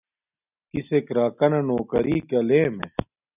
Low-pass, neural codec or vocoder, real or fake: 3.6 kHz; none; real